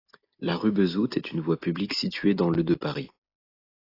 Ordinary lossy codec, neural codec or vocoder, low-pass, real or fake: AAC, 32 kbps; none; 5.4 kHz; real